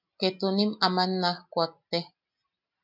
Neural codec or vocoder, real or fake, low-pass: none; real; 5.4 kHz